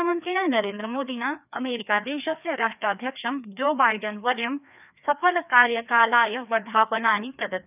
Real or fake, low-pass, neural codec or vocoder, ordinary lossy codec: fake; 3.6 kHz; codec, 16 kHz, 2 kbps, FreqCodec, larger model; none